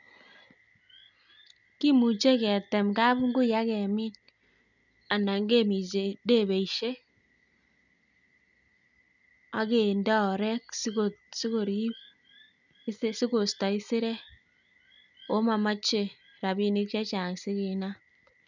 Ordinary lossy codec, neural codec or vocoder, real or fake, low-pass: none; none; real; 7.2 kHz